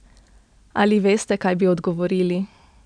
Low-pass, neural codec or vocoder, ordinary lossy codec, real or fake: 9.9 kHz; none; none; real